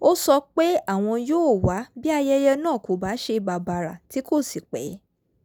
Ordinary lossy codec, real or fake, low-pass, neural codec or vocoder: none; real; none; none